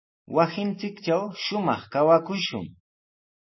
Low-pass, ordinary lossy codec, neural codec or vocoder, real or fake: 7.2 kHz; MP3, 24 kbps; none; real